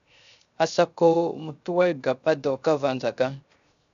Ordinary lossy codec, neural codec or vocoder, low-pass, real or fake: MP3, 96 kbps; codec, 16 kHz, 0.3 kbps, FocalCodec; 7.2 kHz; fake